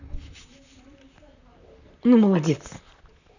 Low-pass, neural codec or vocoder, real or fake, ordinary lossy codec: 7.2 kHz; vocoder, 44.1 kHz, 128 mel bands, Pupu-Vocoder; fake; none